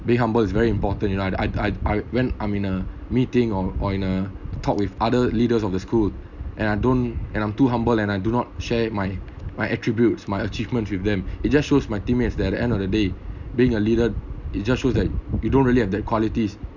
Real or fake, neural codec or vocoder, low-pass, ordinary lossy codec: real; none; 7.2 kHz; none